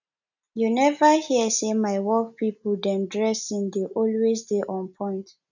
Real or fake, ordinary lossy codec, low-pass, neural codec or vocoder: real; none; 7.2 kHz; none